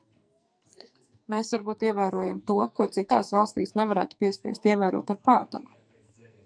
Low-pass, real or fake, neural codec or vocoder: 9.9 kHz; fake; codec, 44.1 kHz, 2.6 kbps, SNAC